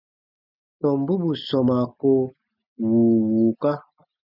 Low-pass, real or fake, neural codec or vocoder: 5.4 kHz; real; none